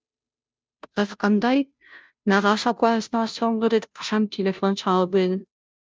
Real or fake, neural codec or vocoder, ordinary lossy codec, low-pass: fake; codec, 16 kHz, 0.5 kbps, FunCodec, trained on Chinese and English, 25 frames a second; none; none